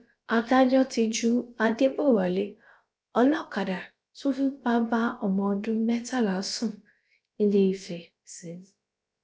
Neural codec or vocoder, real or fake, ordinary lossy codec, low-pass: codec, 16 kHz, about 1 kbps, DyCAST, with the encoder's durations; fake; none; none